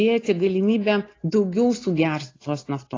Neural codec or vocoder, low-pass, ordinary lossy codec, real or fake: none; 7.2 kHz; AAC, 32 kbps; real